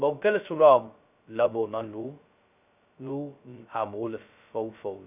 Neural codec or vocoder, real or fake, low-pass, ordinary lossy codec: codec, 16 kHz, 0.2 kbps, FocalCodec; fake; 3.6 kHz; none